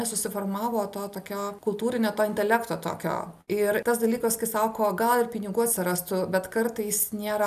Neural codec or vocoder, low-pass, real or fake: none; 14.4 kHz; real